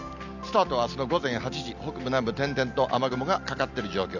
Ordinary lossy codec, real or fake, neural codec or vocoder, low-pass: none; real; none; 7.2 kHz